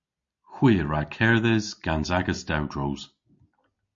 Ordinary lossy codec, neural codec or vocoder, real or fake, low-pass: MP3, 48 kbps; none; real; 7.2 kHz